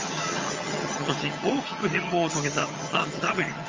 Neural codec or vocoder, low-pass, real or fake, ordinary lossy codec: vocoder, 22.05 kHz, 80 mel bands, HiFi-GAN; 7.2 kHz; fake; Opus, 32 kbps